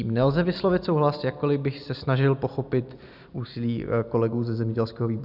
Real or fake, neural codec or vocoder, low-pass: real; none; 5.4 kHz